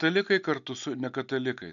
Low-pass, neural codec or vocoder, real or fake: 7.2 kHz; none; real